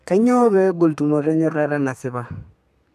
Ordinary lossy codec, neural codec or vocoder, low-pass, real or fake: none; codec, 32 kHz, 1.9 kbps, SNAC; 14.4 kHz; fake